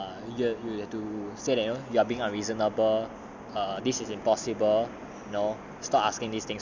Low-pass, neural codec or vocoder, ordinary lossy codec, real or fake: 7.2 kHz; none; none; real